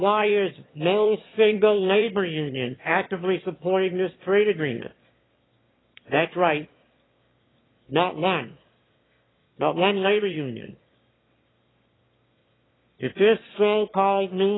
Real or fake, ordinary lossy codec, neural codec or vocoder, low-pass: fake; AAC, 16 kbps; autoencoder, 22.05 kHz, a latent of 192 numbers a frame, VITS, trained on one speaker; 7.2 kHz